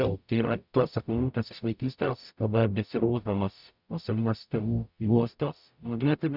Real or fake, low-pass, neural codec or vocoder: fake; 5.4 kHz; codec, 44.1 kHz, 0.9 kbps, DAC